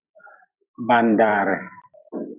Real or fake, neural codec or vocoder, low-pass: fake; vocoder, 44.1 kHz, 128 mel bands every 256 samples, BigVGAN v2; 3.6 kHz